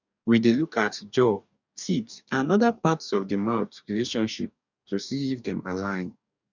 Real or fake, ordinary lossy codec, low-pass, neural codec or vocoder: fake; none; 7.2 kHz; codec, 44.1 kHz, 2.6 kbps, DAC